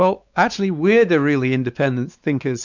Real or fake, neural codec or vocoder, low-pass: fake; codec, 16 kHz, 4 kbps, X-Codec, WavLM features, trained on Multilingual LibriSpeech; 7.2 kHz